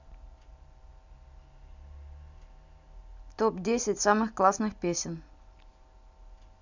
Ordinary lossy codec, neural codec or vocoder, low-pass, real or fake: none; none; 7.2 kHz; real